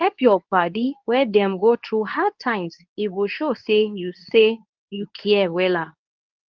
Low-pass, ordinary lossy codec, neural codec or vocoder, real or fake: 7.2 kHz; Opus, 32 kbps; codec, 24 kHz, 0.9 kbps, WavTokenizer, medium speech release version 2; fake